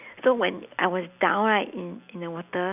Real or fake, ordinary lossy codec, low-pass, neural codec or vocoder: real; none; 3.6 kHz; none